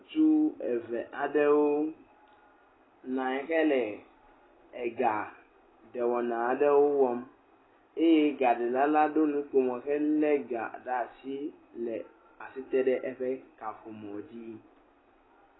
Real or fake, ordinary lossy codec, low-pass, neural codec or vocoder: real; AAC, 16 kbps; 7.2 kHz; none